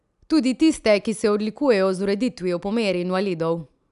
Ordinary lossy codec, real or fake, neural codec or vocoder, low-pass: none; real; none; 10.8 kHz